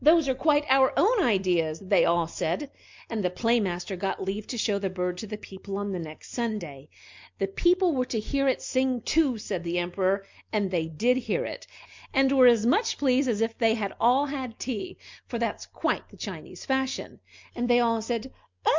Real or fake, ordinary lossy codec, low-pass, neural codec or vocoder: real; MP3, 64 kbps; 7.2 kHz; none